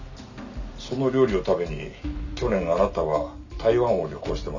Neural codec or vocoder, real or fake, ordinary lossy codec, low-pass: none; real; none; 7.2 kHz